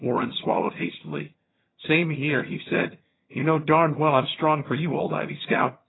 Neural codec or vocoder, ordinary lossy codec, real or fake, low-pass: vocoder, 22.05 kHz, 80 mel bands, HiFi-GAN; AAC, 16 kbps; fake; 7.2 kHz